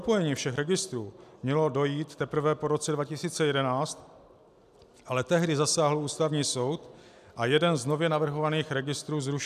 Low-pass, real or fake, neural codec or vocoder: 14.4 kHz; real; none